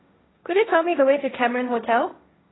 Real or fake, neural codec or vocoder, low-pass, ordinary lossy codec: fake; codec, 16 kHz, 1.1 kbps, Voila-Tokenizer; 7.2 kHz; AAC, 16 kbps